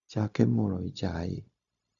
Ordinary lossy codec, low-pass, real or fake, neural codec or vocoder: none; 7.2 kHz; fake; codec, 16 kHz, 0.4 kbps, LongCat-Audio-Codec